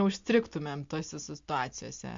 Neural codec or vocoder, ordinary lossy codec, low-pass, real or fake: none; MP3, 48 kbps; 7.2 kHz; real